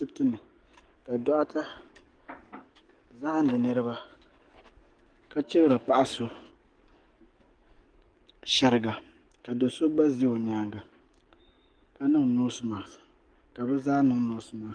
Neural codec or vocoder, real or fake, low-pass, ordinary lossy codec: codec, 44.1 kHz, 7.8 kbps, DAC; fake; 9.9 kHz; Opus, 24 kbps